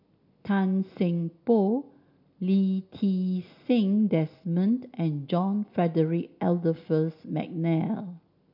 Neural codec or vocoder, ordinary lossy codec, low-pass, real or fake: none; MP3, 48 kbps; 5.4 kHz; real